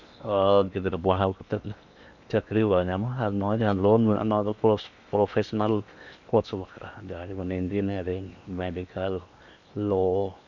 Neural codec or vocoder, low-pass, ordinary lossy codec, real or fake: codec, 16 kHz in and 24 kHz out, 0.8 kbps, FocalCodec, streaming, 65536 codes; 7.2 kHz; none; fake